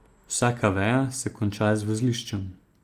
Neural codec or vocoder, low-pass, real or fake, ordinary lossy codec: none; 14.4 kHz; real; Opus, 32 kbps